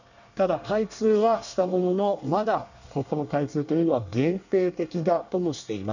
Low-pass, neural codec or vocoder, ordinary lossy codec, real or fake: 7.2 kHz; codec, 24 kHz, 1 kbps, SNAC; none; fake